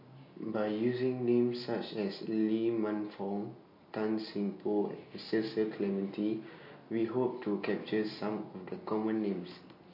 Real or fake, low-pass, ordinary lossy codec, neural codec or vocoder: real; 5.4 kHz; MP3, 32 kbps; none